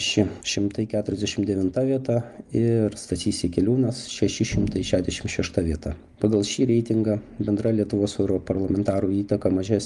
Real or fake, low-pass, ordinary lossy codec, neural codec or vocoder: real; 10.8 kHz; Opus, 32 kbps; none